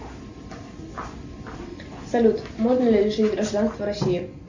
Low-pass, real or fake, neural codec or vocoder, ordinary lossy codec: 7.2 kHz; real; none; Opus, 64 kbps